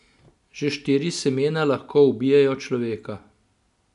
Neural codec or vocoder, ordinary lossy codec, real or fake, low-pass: none; none; real; 10.8 kHz